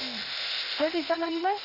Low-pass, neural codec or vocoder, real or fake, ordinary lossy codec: 5.4 kHz; codec, 16 kHz, 0.8 kbps, ZipCodec; fake; none